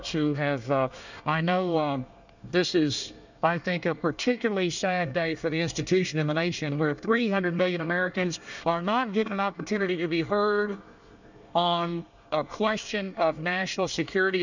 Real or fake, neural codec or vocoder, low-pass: fake; codec, 24 kHz, 1 kbps, SNAC; 7.2 kHz